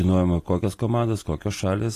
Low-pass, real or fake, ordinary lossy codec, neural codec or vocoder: 14.4 kHz; real; AAC, 48 kbps; none